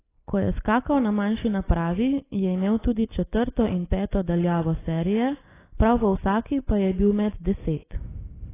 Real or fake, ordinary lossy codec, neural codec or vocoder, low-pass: real; AAC, 16 kbps; none; 3.6 kHz